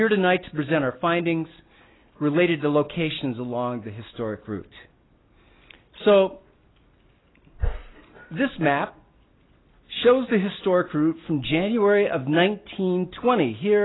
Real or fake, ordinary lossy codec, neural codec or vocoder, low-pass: real; AAC, 16 kbps; none; 7.2 kHz